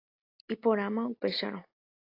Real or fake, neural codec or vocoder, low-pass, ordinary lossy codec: real; none; 5.4 kHz; AAC, 32 kbps